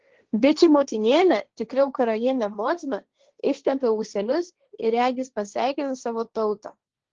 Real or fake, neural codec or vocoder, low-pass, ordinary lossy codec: fake; codec, 16 kHz, 1.1 kbps, Voila-Tokenizer; 7.2 kHz; Opus, 16 kbps